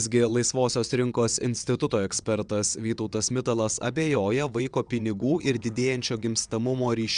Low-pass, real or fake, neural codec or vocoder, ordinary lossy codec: 9.9 kHz; fake; vocoder, 22.05 kHz, 80 mel bands, WaveNeXt; Opus, 64 kbps